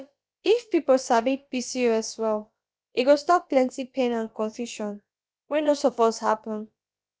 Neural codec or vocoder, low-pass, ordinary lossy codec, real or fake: codec, 16 kHz, about 1 kbps, DyCAST, with the encoder's durations; none; none; fake